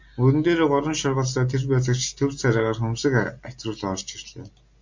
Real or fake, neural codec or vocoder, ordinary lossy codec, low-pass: real; none; MP3, 48 kbps; 7.2 kHz